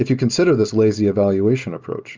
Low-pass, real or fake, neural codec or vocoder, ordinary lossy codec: 7.2 kHz; real; none; Opus, 32 kbps